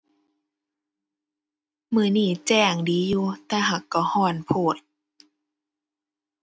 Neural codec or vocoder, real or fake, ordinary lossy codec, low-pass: none; real; none; none